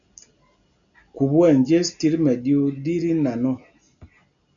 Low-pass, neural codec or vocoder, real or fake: 7.2 kHz; none; real